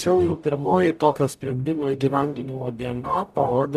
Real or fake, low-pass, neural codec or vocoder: fake; 14.4 kHz; codec, 44.1 kHz, 0.9 kbps, DAC